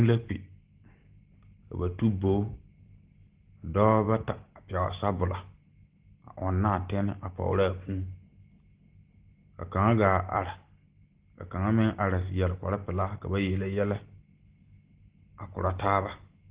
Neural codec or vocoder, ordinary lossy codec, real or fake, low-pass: none; Opus, 16 kbps; real; 3.6 kHz